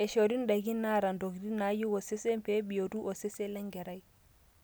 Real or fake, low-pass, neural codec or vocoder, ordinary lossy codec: real; none; none; none